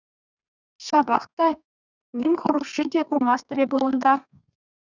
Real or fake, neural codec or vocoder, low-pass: fake; codec, 32 kHz, 1.9 kbps, SNAC; 7.2 kHz